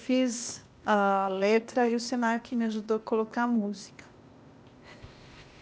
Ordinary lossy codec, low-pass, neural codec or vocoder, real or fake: none; none; codec, 16 kHz, 0.8 kbps, ZipCodec; fake